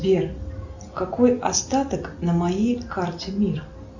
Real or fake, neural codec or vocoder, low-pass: real; none; 7.2 kHz